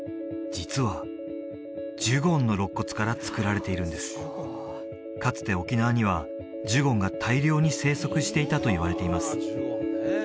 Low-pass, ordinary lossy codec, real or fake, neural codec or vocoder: none; none; real; none